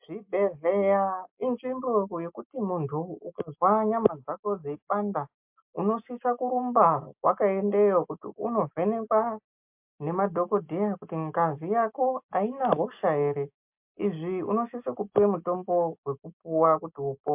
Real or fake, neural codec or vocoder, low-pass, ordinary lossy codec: real; none; 3.6 kHz; MP3, 32 kbps